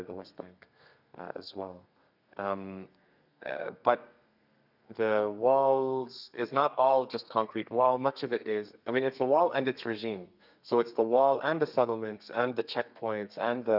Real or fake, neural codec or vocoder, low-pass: fake; codec, 32 kHz, 1.9 kbps, SNAC; 5.4 kHz